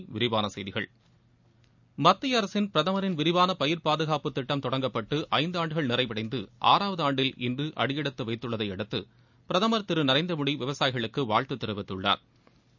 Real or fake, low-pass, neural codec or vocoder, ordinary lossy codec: real; 7.2 kHz; none; none